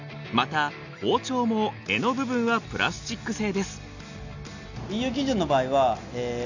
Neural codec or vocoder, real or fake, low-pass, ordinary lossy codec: none; real; 7.2 kHz; none